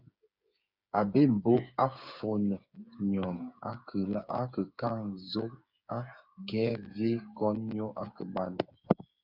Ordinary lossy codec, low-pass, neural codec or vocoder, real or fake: Opus, 64 kbps; 5.4 kHz; codec, 16 kHz, 8 kbps, FreqCodec, smaller model; fake